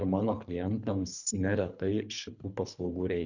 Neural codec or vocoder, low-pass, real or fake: codec, 24 kHz, 3 kbps, HILCodec; 7.2 kHz; fake